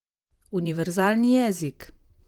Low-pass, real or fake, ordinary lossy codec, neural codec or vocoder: 19.8 kHz; fake; Opus, 24 kbps; vocoder, 44.1 kHz, 128 mel bands, Pupu-Vocoder